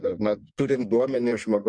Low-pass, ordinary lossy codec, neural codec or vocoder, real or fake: 9.9 kHz; MP3, 64 kbps; codec, 16 kHz in and 24 kHz out, 1.1 kbps, FireRedTTS-2 codec; fake